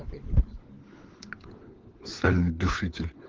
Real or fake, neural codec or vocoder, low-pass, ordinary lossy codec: fake; codec, 16 kHz, 4 kbps, FunCodec, trained on LibriTTS, 50 frames a second; 7.2 kHz; Opus, 16 kbps